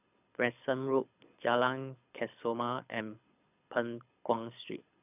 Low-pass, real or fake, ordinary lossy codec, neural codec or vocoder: 3.6 kHz; fake; none; codec, 24 kHz, 6 kbps, HILCodec